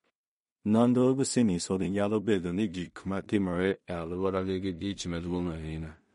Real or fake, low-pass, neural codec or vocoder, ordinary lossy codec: fake; 10.8 kHz; codec, 16 kHz in and 24 kHz out, 0.4 kbps, LongCat-Audio-Codec, two codebook decoder; MP3, 48 kbps